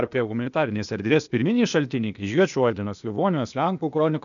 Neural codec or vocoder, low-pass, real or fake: codec, 16 kHz, 0.8 kbps, ZipCodec; 7.2 kHz; fake